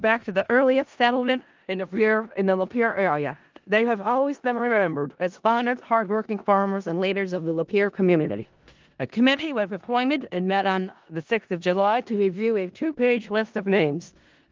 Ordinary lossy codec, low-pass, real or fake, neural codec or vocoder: Opus, 32 kbps; 7.2 kHz; fake; codec, 16 kHz in and 24 kHz out, 0.4 kbps, LongCat-Audio-Codec, four codebook decoder